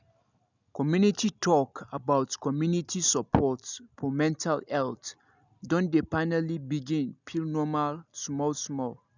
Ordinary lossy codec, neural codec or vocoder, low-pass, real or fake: none; none; 7.2 kHz; real